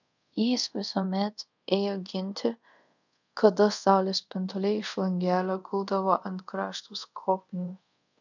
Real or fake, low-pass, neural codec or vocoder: fake; 7.2 kHz; codec, 24 kHz, 0.5 kbps, DualCodec